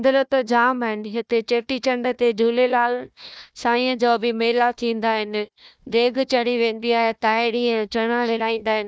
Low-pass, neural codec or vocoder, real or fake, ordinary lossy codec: none; codec, 16 kHz, 1 kbps, FunCodec, trained on Chinese and English, 50 frames a second; fake; none